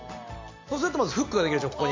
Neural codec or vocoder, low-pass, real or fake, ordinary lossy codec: none; 7.2 kHz; real; MP3, 64 kbps